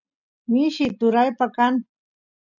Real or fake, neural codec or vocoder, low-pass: real; none; 7.2 kHz